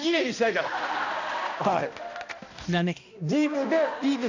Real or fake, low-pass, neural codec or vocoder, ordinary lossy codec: fake; 7.2 kHz; codec, 16 kHz, 1 kbps, X-Codec, HuBERT features, trained on balanced general audio; AAC, 48 kbps